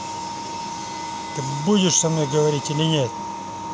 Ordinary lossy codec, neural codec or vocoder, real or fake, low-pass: none; none; real; none